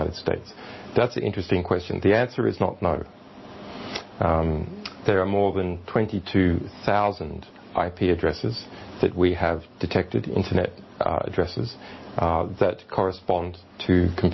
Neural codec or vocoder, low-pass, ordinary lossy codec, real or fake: none; 7.2 kHz; MP3, 24 kbps; real